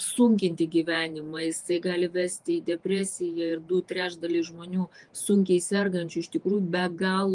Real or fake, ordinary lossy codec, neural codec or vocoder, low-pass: fake; Opus, 32 kbps; vocoder, 48 kHz, 128 mel bands, Vocos; 10.8 kHz